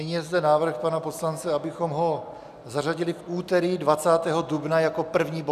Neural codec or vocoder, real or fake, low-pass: none; real; 14.4 kHz